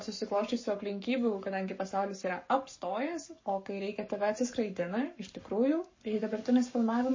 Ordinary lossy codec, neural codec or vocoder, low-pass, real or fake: MP3, 32 kbps; codec, 44.1 kHz, 7.8 kbps, Pupu-Codec; 7.2 kHz; fake